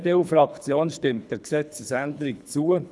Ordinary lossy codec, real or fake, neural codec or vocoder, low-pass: none; fake; codec, 24 kHz, 3 kbps, HILCodec; none